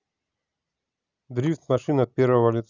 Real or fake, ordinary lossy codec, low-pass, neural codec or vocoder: real; none; 7.2 kHz; none